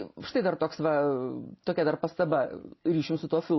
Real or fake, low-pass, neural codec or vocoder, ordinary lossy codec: real; 7.2 kHz; none; MP3, 24 kbps